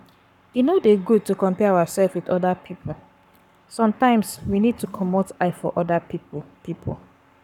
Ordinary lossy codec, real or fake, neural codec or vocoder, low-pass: none; fake; codec, 44.1 kHz, 7.8 kbps, Pupu-Codec; 19.8 kHz